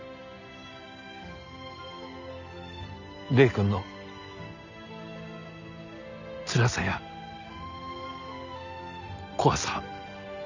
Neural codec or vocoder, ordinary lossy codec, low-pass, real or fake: none; none; 7.2 kHz; real